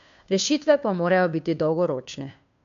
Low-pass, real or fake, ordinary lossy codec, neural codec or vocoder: 7.2 kHz; fake; AAC, 64 kbps; codec, 16 kHz, 2 kbps, FunCodec, trained on Chinese and English, 25 frames a second